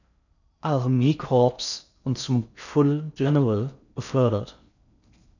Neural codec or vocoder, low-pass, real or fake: codec, 16 kHz in and 24 kHz out, 0.6 kbps, FocalCodec, streaming, 4096 codes; 7.2 kHz; fake